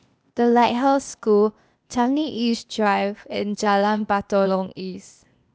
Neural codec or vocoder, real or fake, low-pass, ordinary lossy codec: codec, 16 kHz, 0.8 kbps, ZipCodec; fake; none; none